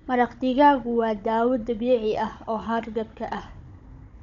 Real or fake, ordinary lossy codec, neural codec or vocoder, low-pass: fake; none; codec, 16 kHz, 16 kbps, FunCodec, trained on Chinese and English, 50 frames a second; 7.2 kHz